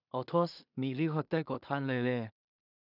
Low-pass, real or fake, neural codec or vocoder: 5.4 kHz; fake; codec, 16 kHz in and 24 kHz out, 0.4 kbps, LongCat-Audio-Codec, two codebook decoder